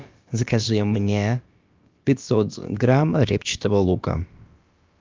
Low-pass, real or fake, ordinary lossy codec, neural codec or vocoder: 7.2 kHz; fake; Opus, 24 kbps; codec, 16 kHz, about 1 kbps, DyCAST, with the encoder's durations